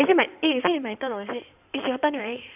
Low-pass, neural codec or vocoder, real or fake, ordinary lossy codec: 3.6 kHz; codec, 16 kHz in and 24 kHz out, 2.2 kbps, FireRedTTS-2 codec; fake; none